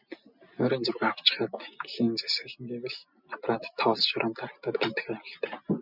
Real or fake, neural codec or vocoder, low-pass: real; none; 5.4 kHz